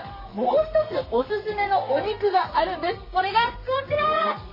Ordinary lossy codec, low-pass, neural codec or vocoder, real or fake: MP3, 24 kbps; 5.4 kHz; codec, 32 kHz, 1.9 kbps, SNAC; fake